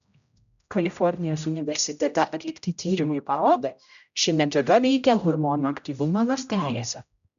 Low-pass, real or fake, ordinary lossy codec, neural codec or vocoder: 7.2 kHz; fake; AAC, 96 kbps; codec, 16 kHz, 0.5 kbps, X-Codec, HuBERT features, trained on general audio